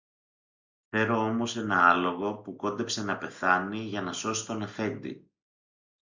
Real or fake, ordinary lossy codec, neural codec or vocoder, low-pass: real; Opus, 64 kbps; none; 7.2 kHz